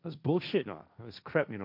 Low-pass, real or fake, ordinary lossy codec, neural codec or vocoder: 5.4 kHz; fake; none; codec, 16 kHz, 1.1 kbps, Voila-Tokenizer